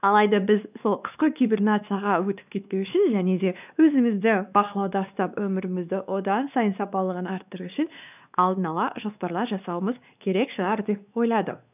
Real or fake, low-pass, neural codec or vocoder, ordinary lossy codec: fake; 3.6 kHz; codec, 16 kHz, 2 kbps, X-Codec, WavLM features, trained on Multilingual LibriSpeech; none